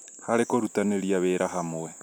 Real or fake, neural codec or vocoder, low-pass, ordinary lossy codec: real; none; none; none